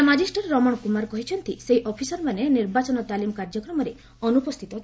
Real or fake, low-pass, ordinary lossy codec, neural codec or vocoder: real; none; none; none